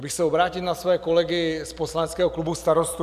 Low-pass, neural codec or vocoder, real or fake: 14.4 kHz; vocoder, 44.1 kHz, 128 mel bands every 256 samples, BigVGAN v2; fake